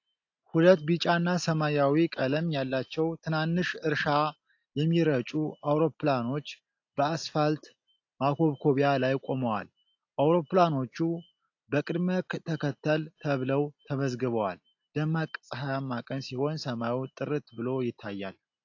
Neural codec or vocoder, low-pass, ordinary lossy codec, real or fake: none; 7.2 kHz; AAC, 48 kbps; real